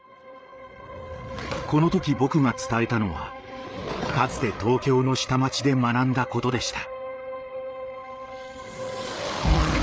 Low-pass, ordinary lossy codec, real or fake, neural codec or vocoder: none; none; fake; codec, 16 kHz, 8 kbps, FreqCodec, larger model